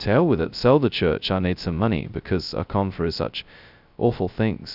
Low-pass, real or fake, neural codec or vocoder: 5.4 kHz; fake; codec, 16 kHz, 0.2 kbps, FocalCodec